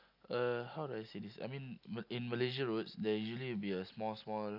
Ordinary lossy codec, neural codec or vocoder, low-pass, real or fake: none; none; 5.4 kHz; real